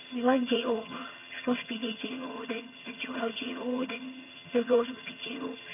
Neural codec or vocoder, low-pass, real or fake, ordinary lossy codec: vocoder, 22.05 kHz, 80 mel bands, HiFi-GAN; 3.6 kHz; fake; none